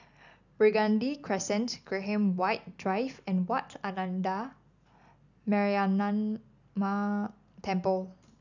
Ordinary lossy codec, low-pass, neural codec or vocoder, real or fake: none; 7.2 kHz; none; real